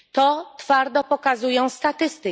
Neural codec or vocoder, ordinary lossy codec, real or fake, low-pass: none; none; real; none